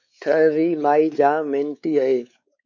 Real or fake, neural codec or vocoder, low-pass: fake; codec, 16 kHz, 4 kbps, X-Codec, WavLM features, trained on Multilingual LibriSpeech; 7.2 kHz